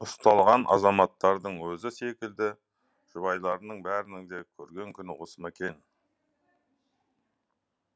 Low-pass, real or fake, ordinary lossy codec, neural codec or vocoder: none; real; none; none